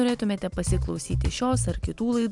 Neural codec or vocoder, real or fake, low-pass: none; real; 10.8 kHz